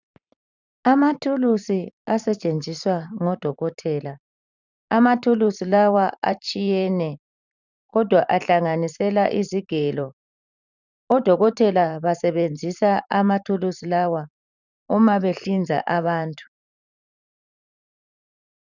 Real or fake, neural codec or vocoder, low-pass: fake; vocoder, 44.1 kHz, 128 mel bands every 512 samples, BigVGAN v2; 7.2 kHz